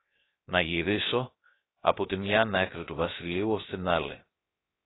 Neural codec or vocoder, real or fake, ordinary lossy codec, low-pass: codec, 16 kHz, 0.3 kbps, FocalCodec; fake; AAC, 16 kbps; 7.2 kHz